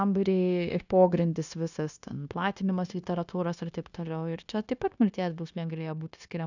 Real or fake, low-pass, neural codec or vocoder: fake; 7.2 kHz; codec, 16 kHz, 0.9 kbps, LongCat-Audio-Codec